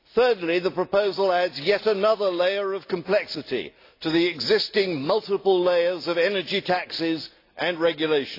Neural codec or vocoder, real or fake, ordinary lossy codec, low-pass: none; real; AAC, 32 kbps; 5.4 kHz